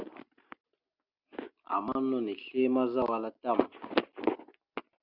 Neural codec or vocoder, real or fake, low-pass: none; real; 5.4 kHz